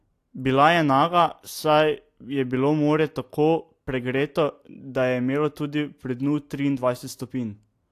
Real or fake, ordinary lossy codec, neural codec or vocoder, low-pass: real; AAC, 64 kbps; none; 14.4 kHz